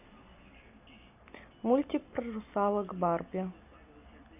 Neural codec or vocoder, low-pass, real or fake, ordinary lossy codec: none; 3.6 kHz; real; none